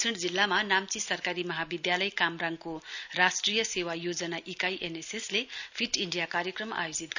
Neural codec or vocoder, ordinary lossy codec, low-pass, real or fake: none; none; 7.2 kHz; real